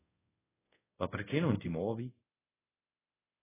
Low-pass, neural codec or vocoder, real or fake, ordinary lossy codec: 3.6 kHz; codec, 24 kHz, 0.5 kbps, DualCodec; fake; AAC, 16 kbps